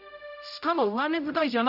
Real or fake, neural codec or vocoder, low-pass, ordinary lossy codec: fake; codec, 16 kHz, 0.5 kbps, X-Codec, HuBERT features, trained on general audio; 5.4 kHz; none